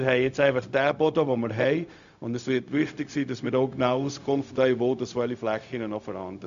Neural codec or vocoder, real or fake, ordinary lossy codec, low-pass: codec, 16 kHz, 0.4 kbps, LongCat-Audio-Codec; fake; AAC, 96 kbps; 7.2 kHz